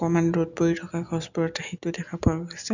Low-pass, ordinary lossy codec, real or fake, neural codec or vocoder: 7.2 kHz; none; real; none